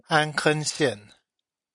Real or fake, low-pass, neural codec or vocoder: real; 10.8 kHz; none